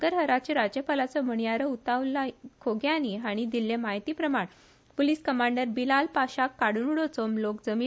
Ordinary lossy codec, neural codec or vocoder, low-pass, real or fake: none; none; none; real